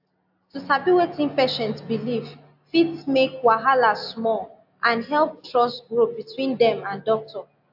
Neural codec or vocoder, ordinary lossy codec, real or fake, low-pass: none; AAC, 48 kbps; real; 5.4 kHz